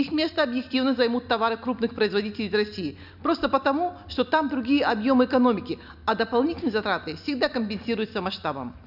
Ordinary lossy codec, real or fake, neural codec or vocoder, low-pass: none; real; none; 5.4 kHz